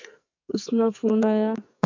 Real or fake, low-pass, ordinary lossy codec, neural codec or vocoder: fake; 7.2 kHz; MP3, 64 kbps; codec, 44.1 kHz, 2.6 kbps, SNAC